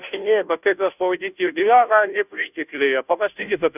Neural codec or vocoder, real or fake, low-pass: codec, 16 kHz, 0.5 kbps, FunCodec, trained on Chinese and English, 25 frames a second; fake; 3.6 kHz